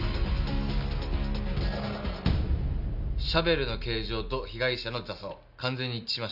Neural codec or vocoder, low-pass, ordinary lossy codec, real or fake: none; 5.4 kHz; none; real